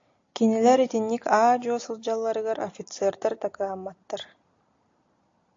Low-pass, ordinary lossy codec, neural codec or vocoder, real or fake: 7.2 kHz; AAC, 48 kbps; none; real